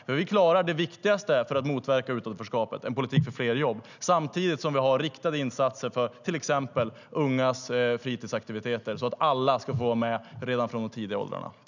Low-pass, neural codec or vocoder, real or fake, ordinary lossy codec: 7.2 kHz; none; real; none